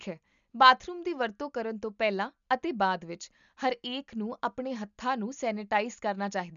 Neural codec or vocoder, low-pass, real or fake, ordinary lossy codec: none; 7.2 kHz; real; none